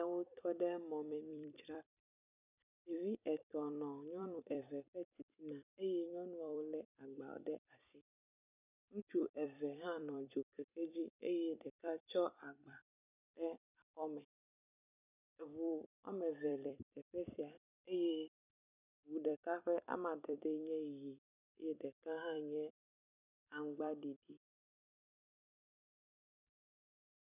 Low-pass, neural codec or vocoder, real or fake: 3.6 kHz; vocoder, 44.1 kHz, 128 mel bands every 256 samples, BigVGAN v2; fake